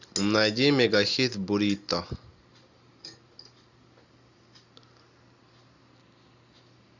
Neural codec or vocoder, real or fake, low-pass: none; real; 7.2 kHz